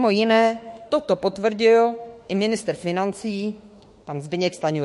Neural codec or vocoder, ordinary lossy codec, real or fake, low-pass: autoencoder, 48 kHz, 32 numbers a frame, DAC-VAE, trained on Japanese speech; MP3, 48 kbps; fake; 14.4 kHz